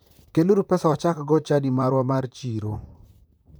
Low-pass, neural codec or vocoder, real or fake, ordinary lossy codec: none; vocoder, 44.1 kHz, 128 mel bands, Pupu-Vocoder; fake; none